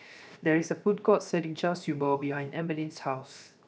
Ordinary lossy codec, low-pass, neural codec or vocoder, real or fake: none; none; codec, 16 kHz, 0.7 kbps, FocalCodec; fake